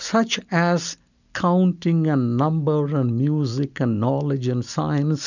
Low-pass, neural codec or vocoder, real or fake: 7.2 kHz; none; real